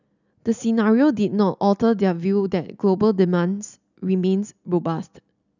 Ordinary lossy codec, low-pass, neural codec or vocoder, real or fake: none; 7.2 kHz; none; real